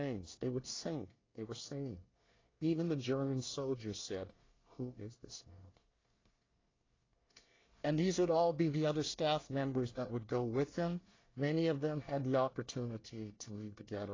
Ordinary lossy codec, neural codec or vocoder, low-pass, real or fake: AAC, 32 kbps; codec, 24 kHz, 1 kbps, SNAC; 7.2 kHz; fake